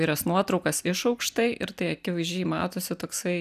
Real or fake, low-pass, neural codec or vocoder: real; 14.4 kHz; none